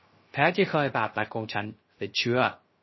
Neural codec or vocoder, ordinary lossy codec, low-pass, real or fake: codec, 16 kHz, 0.3 kbps, FocalCodec; MP3, 24 kbps; 7.2 kHz; fake